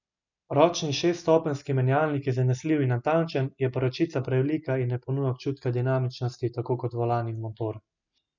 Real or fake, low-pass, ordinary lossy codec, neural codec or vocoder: real; 7.2 kHz; none; none